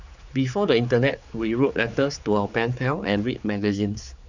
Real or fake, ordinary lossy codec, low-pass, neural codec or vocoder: fake; none; 7.2 kHz; codec, 16 kHz, 4 kbps, X-Codec, HuBERT features, trained on general audio